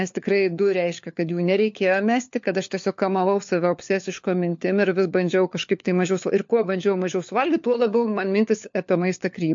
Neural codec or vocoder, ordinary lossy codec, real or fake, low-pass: codec, 16 kHz, 4 kbps, FunCodec, trained on LibriTTS, 50 frames a second; MP3, 48 kbps; fake; 7.2 kHz